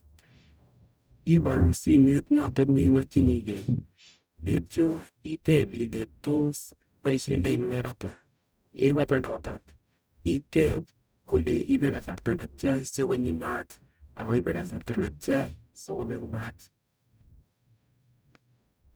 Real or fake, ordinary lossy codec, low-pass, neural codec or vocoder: fake; none; none; codec, 44.1 kHz, 0.9 kbps, DAC